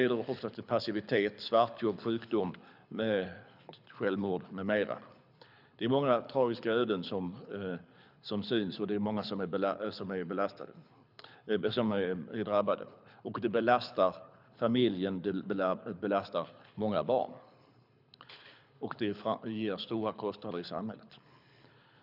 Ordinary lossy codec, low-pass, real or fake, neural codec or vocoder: none; 5.4 kHz; fake; codec, 24 kHz, 6 kbps, HILCodec